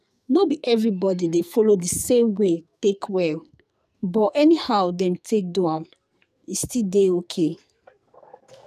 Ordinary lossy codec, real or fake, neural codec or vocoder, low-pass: none; fake; codec, 44.1 kHz, 2.6 kbps, SNAC; 14.4 kHz